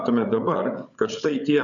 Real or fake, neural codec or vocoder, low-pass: fake; codec, 16 kHz, 8 kbps, FreqCodec, larger model; 7.2 kHz